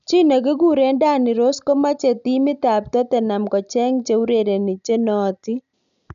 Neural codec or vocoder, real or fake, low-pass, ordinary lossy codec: none; real; 7.2 kHz; none